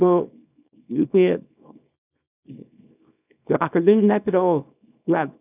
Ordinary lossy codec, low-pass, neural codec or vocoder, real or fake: none; 3.6 kHz; codec, 24 kHz, 0.9 kbps, WavTokenizer, small release; fake